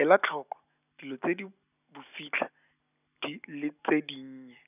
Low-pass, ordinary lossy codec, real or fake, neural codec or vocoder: 3.6 kHz; none; real; none